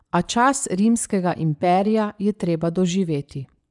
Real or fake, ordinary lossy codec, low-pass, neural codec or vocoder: fake; none; 10.8 kHz; vocoder, 24 kHz, 100 mel bands, Vocos